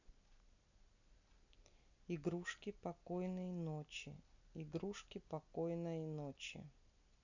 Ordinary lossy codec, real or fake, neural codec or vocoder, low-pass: none; real; none; 7.2 kHz